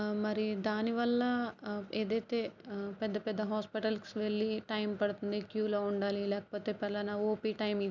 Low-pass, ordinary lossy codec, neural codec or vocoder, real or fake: 7.2 kHz; none; none; real